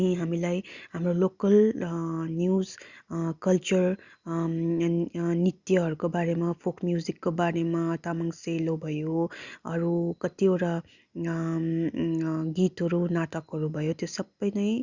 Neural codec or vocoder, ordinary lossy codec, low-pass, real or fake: none; Opus, 64 kbps; 7.2 kHz; real